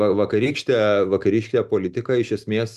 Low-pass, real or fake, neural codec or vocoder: 14.4 kHz; real; none